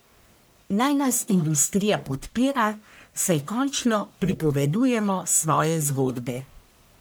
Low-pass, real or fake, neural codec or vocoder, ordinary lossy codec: none; fake; codec, 44.1 kHz, 1.7 kbps, Pupu-Codec; none